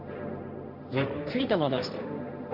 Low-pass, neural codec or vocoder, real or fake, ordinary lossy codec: 5.4 kHz; codec, 16 kHz, 1.1 kbps, Voila-Tokenizer; fake; Opus, 64 kbps